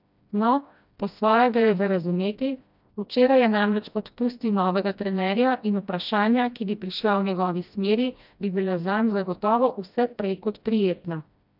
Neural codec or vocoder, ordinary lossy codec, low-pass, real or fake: codec, 16 kHz, 1 kbps, FreqCodec, smaller model; none; 5.4 kHz; fake